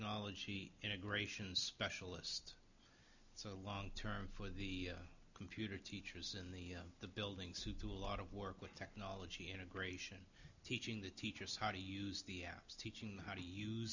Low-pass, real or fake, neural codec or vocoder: 7.2 kHz; real; none